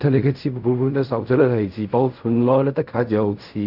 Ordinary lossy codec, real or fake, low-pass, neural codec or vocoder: none; fake; 5.4 kHz; codec, 16 kHz in and 24 kHz out, 0.4 kbps, LongCat-Audio-Codec, fine tuned four codebook decoder